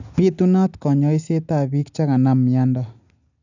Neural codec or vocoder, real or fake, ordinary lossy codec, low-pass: none; real; none; 7.2 kHz